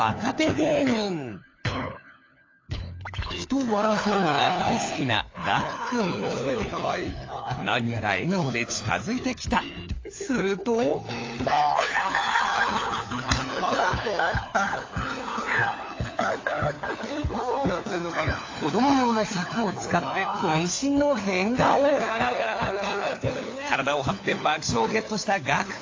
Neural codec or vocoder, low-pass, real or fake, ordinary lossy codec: codec, 16 kHz, 4 kbps, FunCodec, trained on LibriTTS, 50 frames a second; 7.2 kHz; fake; AAC, 32 kbps